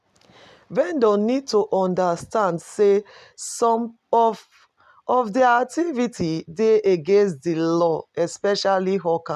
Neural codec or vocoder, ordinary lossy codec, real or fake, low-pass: none; none; real; 14.4 kHz